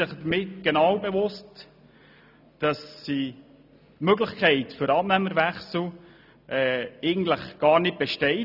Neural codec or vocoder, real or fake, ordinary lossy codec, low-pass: none; real; none; 5.4 kHz